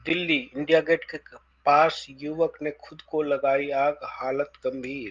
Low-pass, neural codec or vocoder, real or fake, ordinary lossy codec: 7.2 kHz; none; real; Opus, 32 kbps